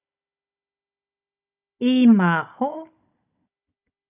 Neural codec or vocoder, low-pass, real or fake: codec, 16 kHz, 16 kbps, FunCodec, trained on Chinese and English, 50 frames a second; 3.6 kHz; fake